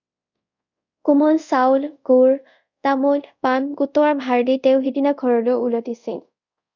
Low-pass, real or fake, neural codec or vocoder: 7.2 kHz; fake; codec, 24 kHz, 0.5 kbps, DualCodec